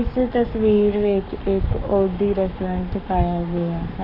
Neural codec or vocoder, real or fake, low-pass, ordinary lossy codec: codec, 44.1 kHz, 7.8 kbps, Pupu-Codec; fake; 5.4 kHz; none